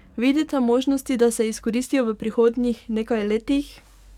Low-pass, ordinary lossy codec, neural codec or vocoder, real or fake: 19.8 kHz; none; codec, 44.1 kHz, 7.8 kbps, DAC; fake